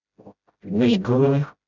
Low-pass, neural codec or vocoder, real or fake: 7.2 kHz; codec, 16 kHz, 0.5 kbps, FreqCodec, smaller model; fake